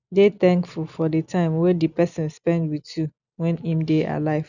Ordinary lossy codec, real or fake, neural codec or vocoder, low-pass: none; real; none; 7.2 kHz